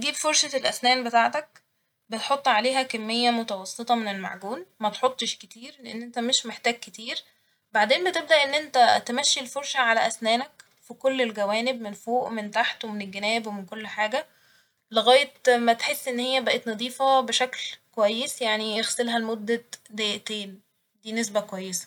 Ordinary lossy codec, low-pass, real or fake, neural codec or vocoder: none; 19.8 kHz; real; none